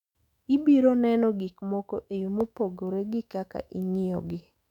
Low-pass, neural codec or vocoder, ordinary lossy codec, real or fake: 19.8 kHz; autoencoder, 48 kHz, 128 numbers a frame, DAC-VAE, trained on Japanese speech; none; fake